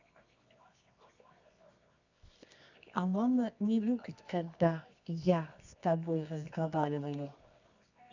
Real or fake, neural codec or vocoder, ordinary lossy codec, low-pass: fake; codec, 24 kHz, 0.9 kbps, WavTokenizer, medium music audio release; none; 7.2 kHz